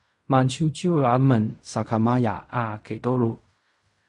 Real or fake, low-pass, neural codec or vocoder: fake; 10.8 kHz; codec, 16 kHz in and 24 kHz out, 0.4 kbps, LongCat-Audio-Codec, fine tuned four codebook decoder